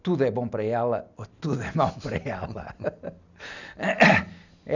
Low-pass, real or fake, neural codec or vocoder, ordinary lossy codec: 7.2 kHz; real; none; none